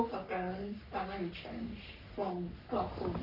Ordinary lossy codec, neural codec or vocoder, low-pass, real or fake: none; codec, 44.1 kHz, 3.4 kbps, Pupu-Codec; 5.4 kHz; fake